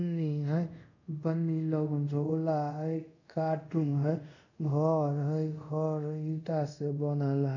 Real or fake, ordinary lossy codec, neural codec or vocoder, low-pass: fake; none; codec, 24 kHz, 0.5 kbps, DualCodec; 7.2 kHz